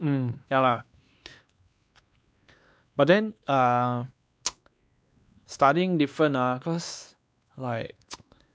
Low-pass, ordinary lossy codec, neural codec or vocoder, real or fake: none; none; codec, 16 kHz, 2 kbps, X-Codec, WavLM features, trained on Multilingual LibriSpeech; fake